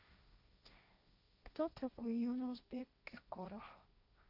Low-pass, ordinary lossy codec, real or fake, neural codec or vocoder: 5.4 kHz; none; fake; codec, 16 kHz, 1.1 kbps, Voila-Tokenizer